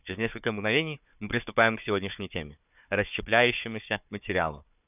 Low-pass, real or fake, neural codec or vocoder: 3.6 kHz; fake; codec, 16 kHz, 4 kbps, FunCodec, trained on Chinese and English, 50 frames a second